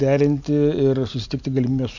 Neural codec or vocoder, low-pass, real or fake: none; 7.2 kHz; real